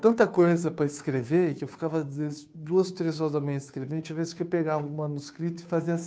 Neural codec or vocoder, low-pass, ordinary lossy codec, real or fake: codec, 16 kHz, 2 kbps, FunCodec, trained on Chinese and English, 25 frames a second; none; none; fake